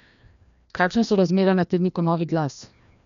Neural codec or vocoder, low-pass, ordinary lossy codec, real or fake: codec, 16 kHz, 1 kbps, FreqCodec, larger model; 7.2 kHz; none; fake